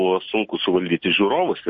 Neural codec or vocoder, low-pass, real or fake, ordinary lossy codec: none; 7.2 kHz; real; MP3, 32 kbps